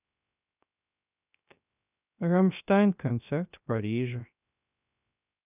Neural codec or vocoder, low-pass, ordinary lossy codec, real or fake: codec, 16 kHz, 0.3 kbps, FocalCodec; 3.6 kHz; none; fake